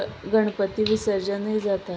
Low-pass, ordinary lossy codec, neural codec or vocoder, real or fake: none; none; none; real